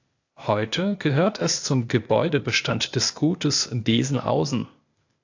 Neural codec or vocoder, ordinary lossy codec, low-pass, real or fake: codec, 16 kHz, 0.8 kbps, ZipCodec; AAC, 48 kbps; 7.2 kHz; fake